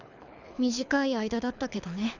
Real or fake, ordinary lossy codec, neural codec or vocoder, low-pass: fake; none; codec, 24 kHz, 6 kbps, HILCodec; 7.2 kHz